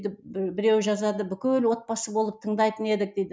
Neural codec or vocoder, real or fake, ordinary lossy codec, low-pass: none; real; none; none